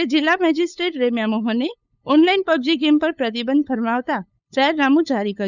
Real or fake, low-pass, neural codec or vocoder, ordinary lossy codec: fake; 7.2 kHz; codec, 16 kHz, 8 kbps, FunCodec, trained on LibriTTS, 25 frames a second; none